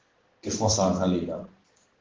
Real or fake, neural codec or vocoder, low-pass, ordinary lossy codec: fake; codec, 16 kHz in and 24 kHz out, 1 kbps, XY-Tokenizer; 7.2 kHz; Opus, 16 kbps